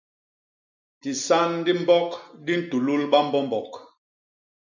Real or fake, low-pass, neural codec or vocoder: real; 7.2 kHz; none